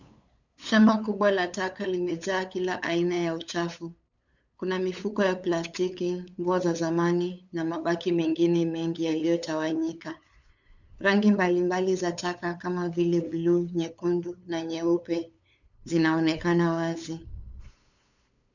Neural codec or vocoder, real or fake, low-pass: codec, 16 kHz, 8 kbps, FunCodec, trained on LibriTTS, 25 frames a second; fake; 7.2 kHz